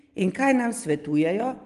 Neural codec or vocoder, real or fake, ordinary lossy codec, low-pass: none; real; Opus, 24 kbps; 10.8 kHz